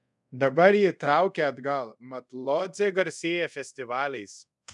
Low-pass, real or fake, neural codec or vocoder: 10.8 kHz; fake; codec, 24 kHz, 0.5 kbps, DualCodec